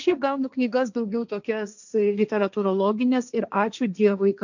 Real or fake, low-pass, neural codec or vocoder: fake; 7.2 kHz; codec, 16 kHz, 1.1 kbps, Voila-Tokenizer